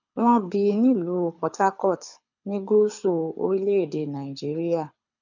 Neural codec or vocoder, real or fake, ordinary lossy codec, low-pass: codec, 24 kHz, 6 kbps, HILCodec; fake; none; 7.2 kHz